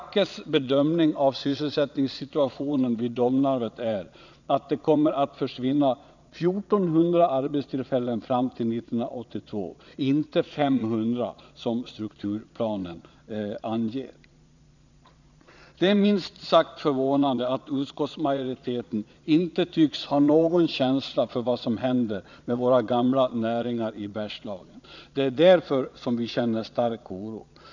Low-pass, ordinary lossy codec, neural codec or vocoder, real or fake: 7.2 kHz; none; vocoder, 22.05 kHz, 80 mel bands, Vocos; fake